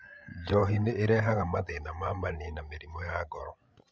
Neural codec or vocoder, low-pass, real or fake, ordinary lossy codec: codec, 16 kHz, 16 kbps, FreqCodec, larger model; none; fake; none